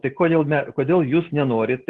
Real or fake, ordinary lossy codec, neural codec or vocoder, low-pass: real; Opus, 16 kbps; none; 10.8 kHz